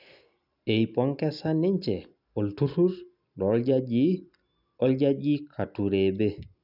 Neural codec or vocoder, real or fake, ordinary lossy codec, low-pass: none; real; none; 5.4 kHz